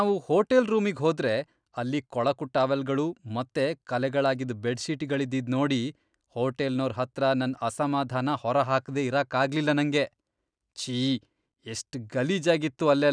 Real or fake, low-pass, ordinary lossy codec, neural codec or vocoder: real; 9.9 kHz; none; none